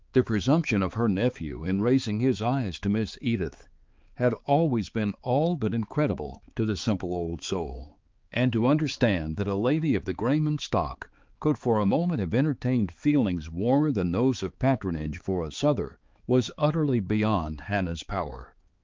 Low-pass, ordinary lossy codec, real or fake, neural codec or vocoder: 7.2 kHz; Opus, 32 kbps; fake; codec, 16 kHz, 4 kbps, X-Codec, HuBERT features, trained on balanced general audio